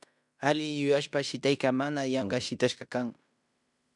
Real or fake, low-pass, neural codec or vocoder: fake; 10.8 kHz; codec, 16 kHz in and 24 kHz out, 0.9 kbps, LongCat-Audio-Codec, fine tuned four codebook decoder